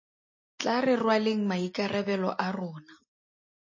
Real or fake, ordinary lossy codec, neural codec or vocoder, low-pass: real; MP3, 32 kbps; none; 7.2 kHz